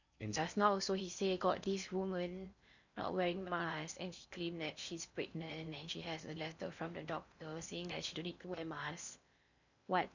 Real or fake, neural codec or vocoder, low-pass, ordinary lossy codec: fake; codec, 16 kHz in and 24 kHz out, 0.8 kbps, FocalCodec, streaming, 65536 codes; 7.2 kHz; none